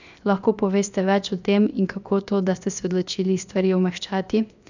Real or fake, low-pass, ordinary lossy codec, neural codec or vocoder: fake; 7.2 kHz; none; codec, 16 kHz, 0.7 kbps, FocalCodec